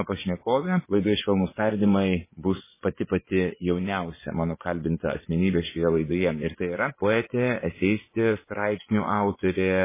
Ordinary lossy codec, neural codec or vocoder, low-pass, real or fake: MP3, 16 kbps; vocoder, 24 kHz, 100 mel bands, Vocos; 3.6 kHz; fake